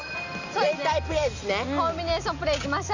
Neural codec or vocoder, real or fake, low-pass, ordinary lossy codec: none; real; 7.2 kHz; none